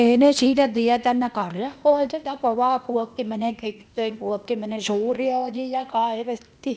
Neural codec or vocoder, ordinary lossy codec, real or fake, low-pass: codec, 16 kHz, 0.8 kbps, ZipCodec; none; fake; none